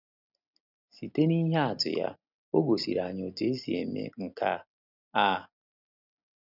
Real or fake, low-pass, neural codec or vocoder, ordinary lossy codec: real; 5.4 kHz; none; none